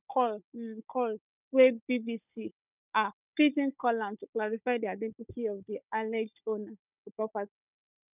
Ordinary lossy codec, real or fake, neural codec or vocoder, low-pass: none; fake; codec, 16 kHz, 16 kbps, FunCodec, trained on Chinese and English, 50 frames a second; 3.6 kHz